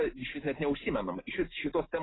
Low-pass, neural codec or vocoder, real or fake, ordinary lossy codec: 7.2 kHz; none; real; AAC, 16 kbps